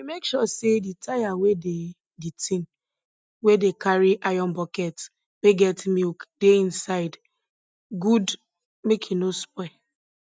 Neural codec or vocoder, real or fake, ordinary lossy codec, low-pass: none; real; none; none